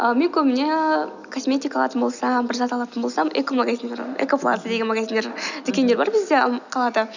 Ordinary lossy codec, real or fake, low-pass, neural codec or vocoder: none; real; 7.2 kHz; none